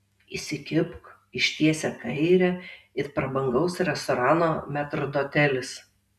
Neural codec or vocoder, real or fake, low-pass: none; real; 14.4 kHz